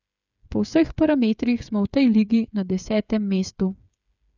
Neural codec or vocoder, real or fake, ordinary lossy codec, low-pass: codec, 16 kHz, 8 kbps, FreqCodec, smaller model; fake; none; 7.2 kHz